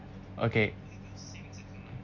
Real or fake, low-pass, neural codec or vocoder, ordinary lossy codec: real; 7.2 kHz; none; none